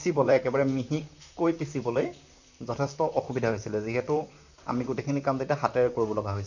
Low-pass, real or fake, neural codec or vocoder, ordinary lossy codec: 7.2 kHz; fake; vocoder, 44.1 kHz, 128 mel bands, Pupu-Vocoder; none